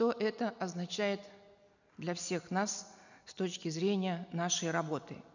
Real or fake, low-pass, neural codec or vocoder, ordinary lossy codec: real; 7.2 kHz; none; none